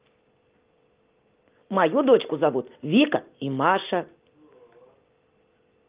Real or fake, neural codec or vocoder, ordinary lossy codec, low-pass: real; none; Opus, 32 kbps; 3.6 kHz